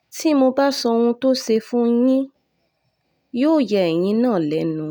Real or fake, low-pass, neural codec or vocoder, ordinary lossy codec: real; 19.8 kHz; none; none